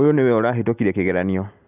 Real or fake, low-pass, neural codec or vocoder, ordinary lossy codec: real; 3.6 kHz; none; none